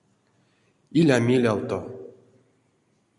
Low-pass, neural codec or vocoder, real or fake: 10.8 kHz; none; real